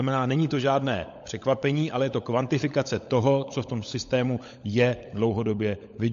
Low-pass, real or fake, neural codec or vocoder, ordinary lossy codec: 7.2 kHz; fake; codec, 16 kHz, 16 kbps, FunCodec, trained on LibriTTS, 50 frames a second; MP3, 48 kbps